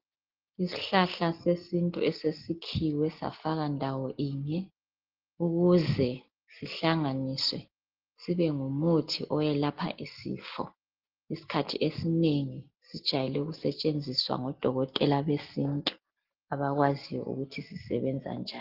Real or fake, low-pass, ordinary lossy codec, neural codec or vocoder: real; 5.4 kHz; Opus, 16 kbps; none